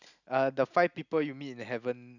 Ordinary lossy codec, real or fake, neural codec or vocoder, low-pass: none; real; none; 7.2 kHz